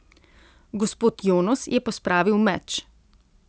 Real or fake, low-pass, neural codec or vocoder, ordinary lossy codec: real; none; none; none